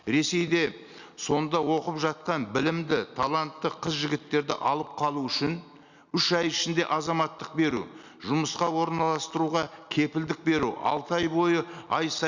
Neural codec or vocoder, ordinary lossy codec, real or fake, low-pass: none; Opus, 64 kbps; real; 7.2 kHz